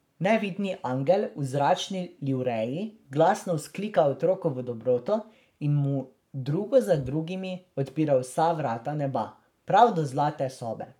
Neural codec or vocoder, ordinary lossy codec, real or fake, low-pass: codec, 44.1 kHz, 7.8 kbps, Pupu-Codec; none; fake; 19.8 kHz